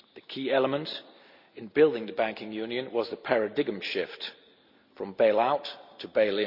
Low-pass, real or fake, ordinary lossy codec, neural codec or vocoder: 5.4 kHz; real; none; none